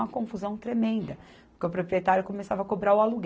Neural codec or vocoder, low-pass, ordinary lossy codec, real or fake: none; none; none; real